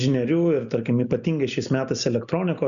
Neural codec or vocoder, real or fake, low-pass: none; real; 7.2 kHz